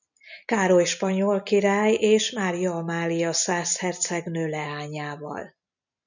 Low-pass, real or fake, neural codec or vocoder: 7.2 kHz; real; none